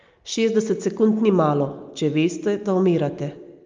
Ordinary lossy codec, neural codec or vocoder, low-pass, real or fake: Opus, 32 kbps; none; 7.2 kHz; real